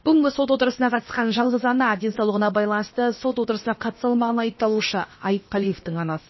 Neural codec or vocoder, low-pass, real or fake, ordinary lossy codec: codec, 16 kHz, about 1 kbps, DyCAST, with the encoder's durations; 7.2 kHz; fake; MP3, 24 kbps